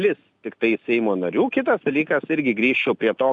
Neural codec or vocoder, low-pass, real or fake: none; 10.8 kHz; real